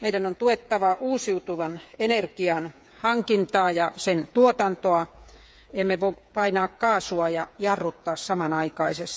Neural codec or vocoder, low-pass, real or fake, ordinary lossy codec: codec, 16 kHz, 8 kbps, FreqCodec, smaller model; none; fake; none